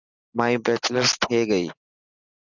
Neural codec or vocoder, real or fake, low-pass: none; real; 7.2 kHz